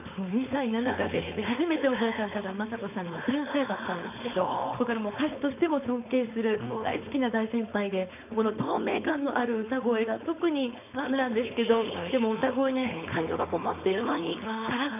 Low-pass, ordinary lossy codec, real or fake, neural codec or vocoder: 3.6 kHz; none; fake; codec, 16 kHz, 4.8 kbps, FACodec